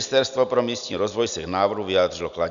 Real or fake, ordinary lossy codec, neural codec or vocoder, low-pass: real; MP3, 96 kbps; none; 7.2 kHz